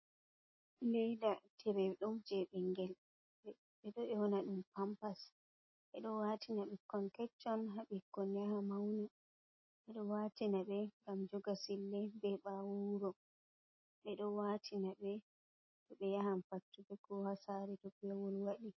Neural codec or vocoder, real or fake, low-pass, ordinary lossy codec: none; real; 7.2 kHz; MP3, 24 kbps